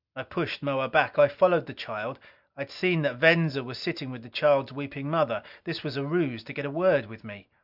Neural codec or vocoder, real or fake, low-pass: none; real; 5.4 kHz